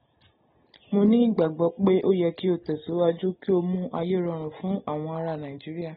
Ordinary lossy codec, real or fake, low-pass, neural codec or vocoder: AAC, 16 kbps; real; 19.8 kHz; none